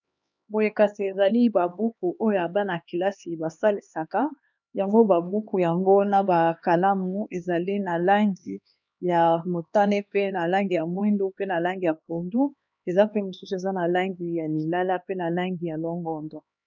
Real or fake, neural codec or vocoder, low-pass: fake; codec, 16 kHz, 2 kbps, X-Codec, HuBERT features, trained on LibriSpeech; 7.2 kHz